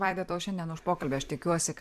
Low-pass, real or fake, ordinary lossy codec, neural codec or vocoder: 14.4 kHz; fake; AAC, 96 kbps; vocoder, 44.1 kHz, 128 mel bands every 256 samples, BigVGAN v2